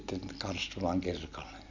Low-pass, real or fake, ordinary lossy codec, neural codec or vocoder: 7.2 kHz; real; Opus, 64 kbps; none